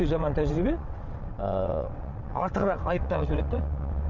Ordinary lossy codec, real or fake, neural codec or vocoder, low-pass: none; fake; vocoder, 22.05 kHz, 80 mel bands, WaveNeXt; 7.2 kHz